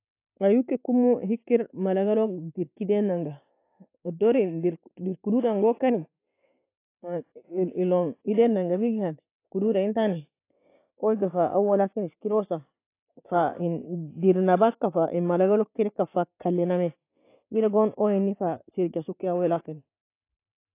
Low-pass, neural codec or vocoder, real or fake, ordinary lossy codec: 3.6 kHz; none; real; AAC, 24 kbps